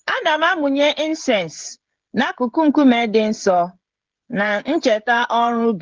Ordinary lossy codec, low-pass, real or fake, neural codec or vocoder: Opus, 16 kbps; 7.2 kHz; fake; codec, 16 kHz, 16 kbps, FreqCodec, smaller model